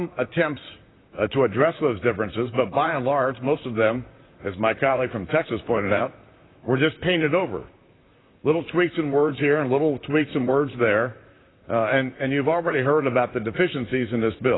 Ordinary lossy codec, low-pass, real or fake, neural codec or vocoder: AAC, 16 kbps; 7.2 kHz; fake; vocoder, 44.1 kHz, 128 mel bands, Pupu-Vocoder